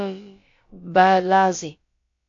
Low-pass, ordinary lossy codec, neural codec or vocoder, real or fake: 7.2 kHz; AAC, 48 kbps; codec, 16 kHz, about 1 kbps, DyCAST, with the encoder's durations; fake